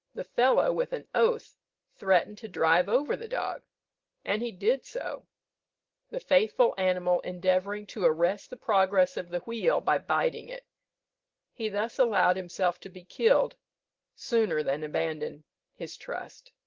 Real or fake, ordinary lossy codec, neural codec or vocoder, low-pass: real; Opus, 16 kbps; none; 7.2 kHz